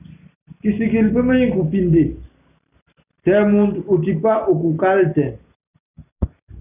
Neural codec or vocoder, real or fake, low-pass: none; real; 3.6 kHz